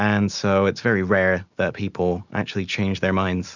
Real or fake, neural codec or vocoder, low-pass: real; none; 7.2 kHz